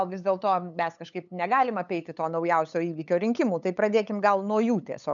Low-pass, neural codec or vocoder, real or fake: 7.2 kHz; codec, 16 kHz, 8 kbps, FunCodec, trained on LibriTTS, 25 frames a second; fake